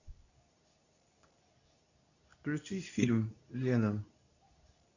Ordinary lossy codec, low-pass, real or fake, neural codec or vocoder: none; 7.2 kHz; fake; codec, 24 kHz, 0.9 kbps, WavTokenizer, medium speech release version 2